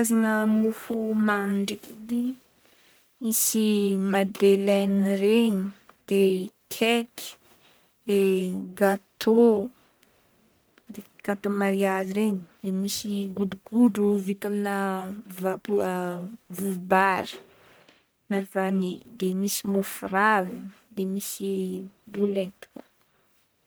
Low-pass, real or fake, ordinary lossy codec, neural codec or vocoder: none; fake; none; codec, 44.1 kHz, 1.7 kbps, Pupu-Codec